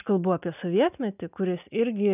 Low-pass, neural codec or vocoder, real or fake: 3.6 kHz; none; real